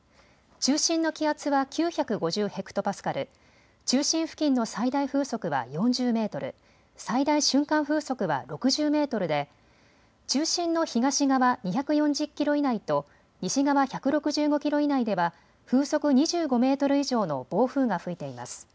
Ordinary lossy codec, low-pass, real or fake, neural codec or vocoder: none; none; real; none